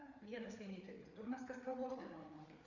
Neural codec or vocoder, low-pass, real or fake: codec, 16 kHz, 4 kbps, FreqCodec, larger model; 7.2 kHz; fake